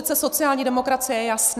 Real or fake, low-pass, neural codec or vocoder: real; 14.4 kHz; none